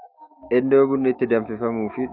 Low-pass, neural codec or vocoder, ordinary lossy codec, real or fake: 5.4 kHz; autoencoder, 48 kHz, 128 numbers a frame, DAC-VAE, trained on Japanese speech; AAC, 48 kbps; fake